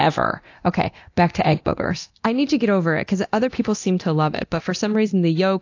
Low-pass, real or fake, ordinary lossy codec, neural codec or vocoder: 7.2 kHz; fake; AAC, 48 kbps; codec, 24 kHz, 0.9 kbps, DualCodec